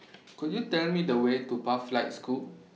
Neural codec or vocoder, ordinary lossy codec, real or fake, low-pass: none; none; real; none